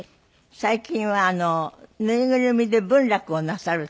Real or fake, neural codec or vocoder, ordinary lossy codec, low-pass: real; none; none; none